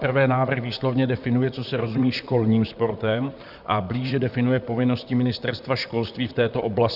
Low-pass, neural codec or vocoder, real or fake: 5.4 kHz; vocoder, 44.1 kHz, 128 mel bands, Pupu-Vocoder; fake